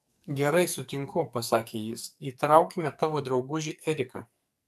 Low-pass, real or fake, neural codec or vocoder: 14.4 kHz; fake; codec, 44.1 kHz, 2.6 kbps, SNAC